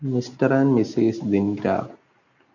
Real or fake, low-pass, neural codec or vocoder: real; 7.2 kHz; none